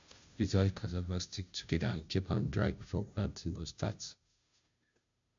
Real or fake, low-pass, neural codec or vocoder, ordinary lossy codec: fake; 7.2 kHz; codec, 16 kHz, 0.5 kbps, FunCodec, trained on Chinese and English, 25 frames a second; MP3, 64 kbps